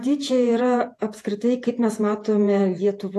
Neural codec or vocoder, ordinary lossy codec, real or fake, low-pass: vocoder, 48 kHz, 128 mel bands, Vocos; AAC, 64 kbps; fake; 14.4 kHz